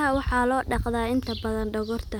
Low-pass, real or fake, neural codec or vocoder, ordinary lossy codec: none; real; none; none